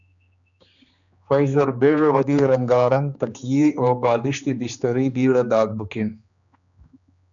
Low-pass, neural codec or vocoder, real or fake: 7.2 kHz; codec, 16 kHz, 2 kbps, X-Codec, HuBERT features, trained on general audio; fake